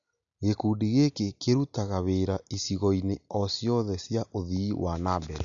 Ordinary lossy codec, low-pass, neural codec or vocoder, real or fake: none; 7.2 kHz; none; real